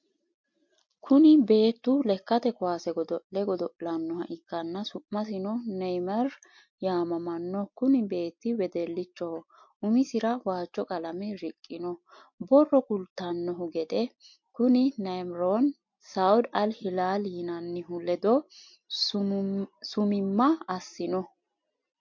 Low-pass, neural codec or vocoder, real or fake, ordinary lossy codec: 7.2 kHz; none; real; MP3, 48 kbps